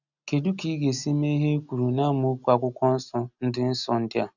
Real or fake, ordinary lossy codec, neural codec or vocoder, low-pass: real; none; none; 7.2 kHz